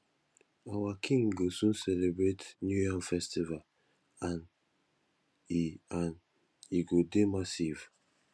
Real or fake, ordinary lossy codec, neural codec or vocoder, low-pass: real; none; none; none